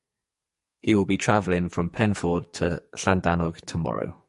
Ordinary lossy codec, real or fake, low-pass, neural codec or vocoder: MP3, 48 kbps; fake; 14.4 kHz; codec, 44.1 kHz, 2.6 kbps, SNAC